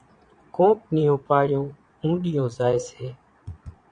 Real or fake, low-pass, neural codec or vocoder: fake; 9.9 kHz; vocoder, 22.05 kHz, 80 mel bands, Vocos